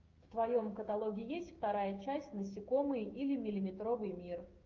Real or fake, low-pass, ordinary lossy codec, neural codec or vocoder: fake; 7.2 kHz; Opus, 32 kbps; codec, 16 kHz, 6 kbps, DAC